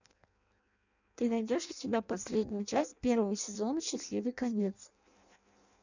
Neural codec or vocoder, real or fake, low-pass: codec, 16 kHz in and 24 kHz out, 0.6 kbps, FireRedTTS-2 codec; fake; 7.2 kHz